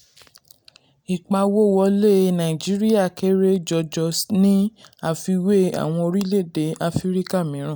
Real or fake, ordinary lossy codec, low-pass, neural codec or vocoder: real; none; none; none